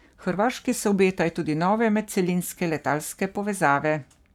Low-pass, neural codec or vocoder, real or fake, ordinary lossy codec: 19.8 kHz; codec, 44.1 kHz, 7.8 kbps, Pupu-Codec; fake; none